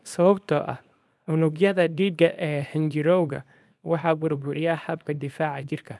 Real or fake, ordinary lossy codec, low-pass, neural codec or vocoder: fake; none; none; codec, 24 kHz, 0.9 kbps, WavTokenizer, small release